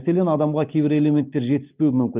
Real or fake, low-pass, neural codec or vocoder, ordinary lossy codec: real; 3.6 kHz; none; Opus, 32 kbps